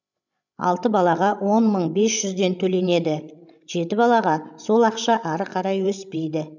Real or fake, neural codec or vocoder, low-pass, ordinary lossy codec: fake; codec, 16 kHz, 16 kbps, FreqCodec, larger model; 7.2 kHz; none